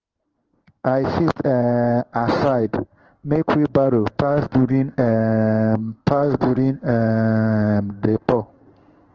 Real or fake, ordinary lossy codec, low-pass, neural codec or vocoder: fake; Opus, 16 kbps; 7.2 kHz; codec, 16 kHz in and 24 kHz out, 1 kbps, XY-Tokenizer